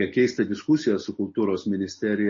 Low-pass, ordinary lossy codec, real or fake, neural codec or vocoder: 7.2 kHz; MP3, 32 kbps; real; none